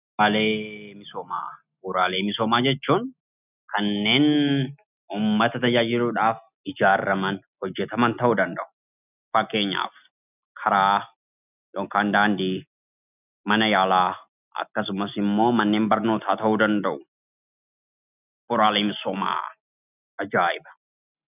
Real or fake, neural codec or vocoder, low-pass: real; none; 3.6 kHz